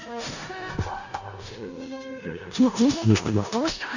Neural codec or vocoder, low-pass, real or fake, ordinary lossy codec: codec, 16 kHz in and 24 kHz out, 0.4 kbps, LongCat-Audio-Codec, four codebook decoder; 7.2 kHz; fake; none